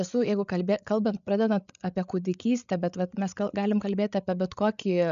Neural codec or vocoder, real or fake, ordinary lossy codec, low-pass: codec, 16 kHz, 8 kbps, FreqCodec, larger model; fake; MP3, 96 kbps; 7.2 kHz